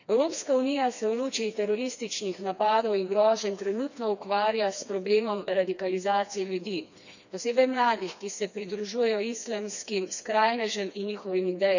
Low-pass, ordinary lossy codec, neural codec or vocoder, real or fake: 7.2 kHz; none; codec, 16 kHz, 2 kbps, FreqCodec, smaller model; fake